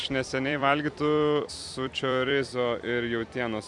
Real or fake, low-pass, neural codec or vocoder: fake; 10.8 kHz; vocoder, 44.1 kHz, 128 mel bands every 256 samples, BigVGAN v2